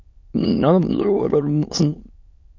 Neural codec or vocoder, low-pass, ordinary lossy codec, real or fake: autoencoder, 22.05 kHz, a latent of 192 numbers a frame, VITS, trained on many speakers; 7.2 kHz; MP3, 48 kbps; fake